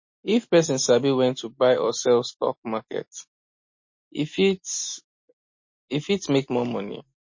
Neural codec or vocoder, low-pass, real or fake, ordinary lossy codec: none; 7.2 kHz; real; MP3, 32 kbps